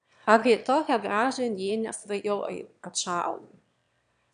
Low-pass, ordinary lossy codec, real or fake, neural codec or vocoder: 9.9 kHz; AAC, 96 kbps; fake; autoencoder, 22.05 kHz, a latent of 192 numbers a frame, VITS, trained on one speaker